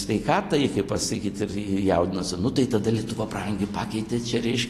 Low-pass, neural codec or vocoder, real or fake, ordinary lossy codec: 14.4 kHz; none; real; AAC, 48 kbps